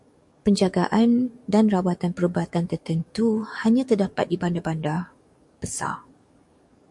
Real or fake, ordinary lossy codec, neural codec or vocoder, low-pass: fake; MP3, 64 kbps; codec, 44.1 kHz, 7.8 kbps, DAC; 10.8 kHz